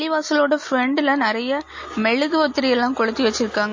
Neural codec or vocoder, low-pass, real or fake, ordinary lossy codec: none; 7.2 kHz; real; MP3, 32 kbps